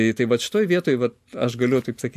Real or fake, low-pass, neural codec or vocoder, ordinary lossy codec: real; 14.4 kHz; none; MP3, 64 kbps